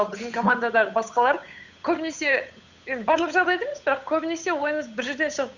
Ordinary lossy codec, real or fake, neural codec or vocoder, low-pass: Opus, 64 kbps; fake; codec, 16 kHz, 8 kbps, FunCodec, trained on Chinese and English, 25 frames a second; 7.2 kHz